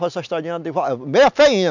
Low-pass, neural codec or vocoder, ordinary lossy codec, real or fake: 7.2 kHz; none; none; real